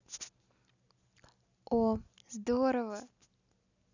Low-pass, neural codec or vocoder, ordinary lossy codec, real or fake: 7.2 kHz; none; none; real